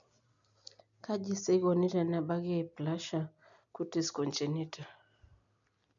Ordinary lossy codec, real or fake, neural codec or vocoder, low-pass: none; real; none; 7.2 kHz